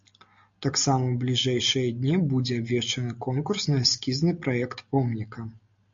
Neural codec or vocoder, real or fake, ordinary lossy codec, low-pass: none; real; MP3, 64 kbps; 7.2 kHz